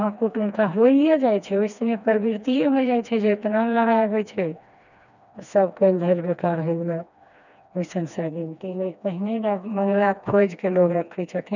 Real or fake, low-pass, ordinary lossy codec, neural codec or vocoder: fake; 7.2 kHz; none; codec, 16 kHz, 2 kbps, FreqCodec, smaller model